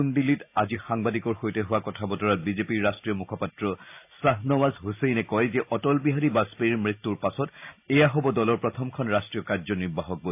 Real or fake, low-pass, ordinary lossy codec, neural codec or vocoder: real; 3.6 kHz; AAC, 32 kbps; none